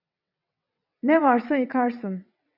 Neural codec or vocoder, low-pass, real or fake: vocoder, 22.05 kHz, 80 mel bands, WaveNeXt; 5.4 kHz; fake